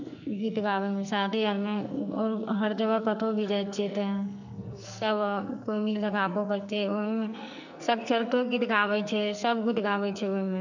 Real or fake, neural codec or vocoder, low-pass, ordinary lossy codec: fake; codec, 44.1 kHz, 2.6 kbps, SNAC; 7.2 kHz; none